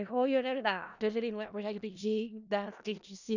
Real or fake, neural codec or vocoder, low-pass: fake; codec, 16 kHz in and 24 kHz out, 0.4 kbps, LongCat-Audio-Codec, four codebook decoder; 7.2 kHz